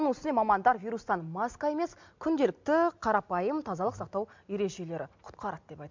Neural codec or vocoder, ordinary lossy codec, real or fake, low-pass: none; MP3, 64 kbps; real; 7.2 kHz